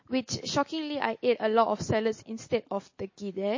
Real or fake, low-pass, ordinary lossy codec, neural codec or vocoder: real; 7.2 kHz; MP3, 32 kbps; none